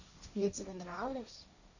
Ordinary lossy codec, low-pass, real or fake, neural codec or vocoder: none; 7.2 kHz; fake; codec, 16 kHz, 1.1 kbps, Voila-Tokenizer